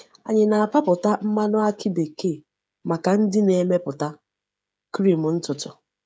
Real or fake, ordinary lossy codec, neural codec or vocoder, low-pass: fake; none; codec, 16 kHz, 16 kbps, FreqCodec, smaller model; none